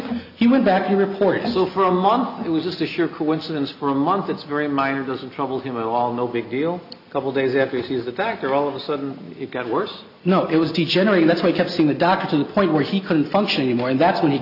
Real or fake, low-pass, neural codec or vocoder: real; 5.4 kHz; none